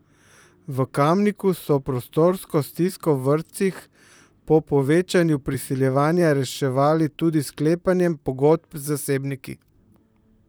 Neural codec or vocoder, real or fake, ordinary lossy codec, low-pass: vocoder, 44.1 kHz, 128 mel bands, Pupu-Vocoder; fake; none; none